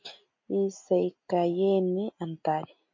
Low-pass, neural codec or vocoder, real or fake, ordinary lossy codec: 7.2 kHz; none; real; MP3, 48 kbps